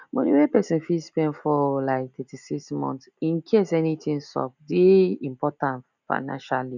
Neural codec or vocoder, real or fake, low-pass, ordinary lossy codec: none; real; 7.2 kHz; none